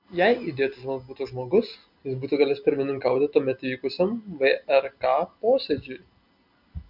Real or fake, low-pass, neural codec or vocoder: real; 5.4 kHz; none